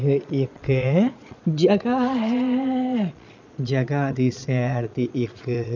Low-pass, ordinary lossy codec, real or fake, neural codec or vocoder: 7.2 kHz; none; fake; vocoder, 22.05 kHz, 80 mel bands, Vocos